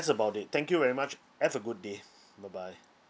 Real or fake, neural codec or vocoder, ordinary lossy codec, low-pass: real; none; none; none